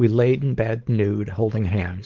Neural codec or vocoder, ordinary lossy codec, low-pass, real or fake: codec, 16 kHz, 4.8 kbps, FACodec; Opus, 16 kbps; 7.2 kHz; fake